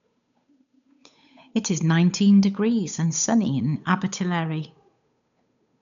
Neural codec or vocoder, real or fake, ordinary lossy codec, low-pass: codec, 16 kHz, 8 kbps, FunCodec, trained on Chinese and English, 25 frames a second; fake; none; 7.2 kHz